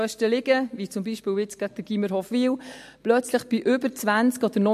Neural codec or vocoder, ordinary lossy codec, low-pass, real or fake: none; MP3, 64 kbps; 14.4 kHz; real